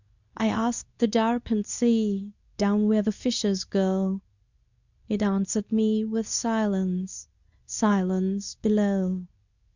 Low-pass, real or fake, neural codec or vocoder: 7.2 kHz; fake; codec, 24 kHz, 0.9 kbps, WavTokenizer, medium speech release version 2